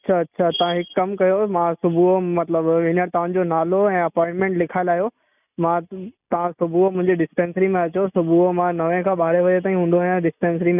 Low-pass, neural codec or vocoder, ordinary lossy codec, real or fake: 3.6 kHz; none; none; real